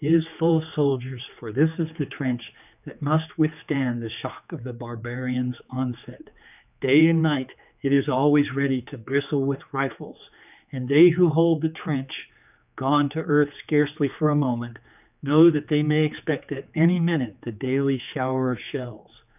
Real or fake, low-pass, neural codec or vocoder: fake; 3.6 kHz; codec, 16 kHz, 4 kbps, X-Codec, HuBERT features, trained on general audio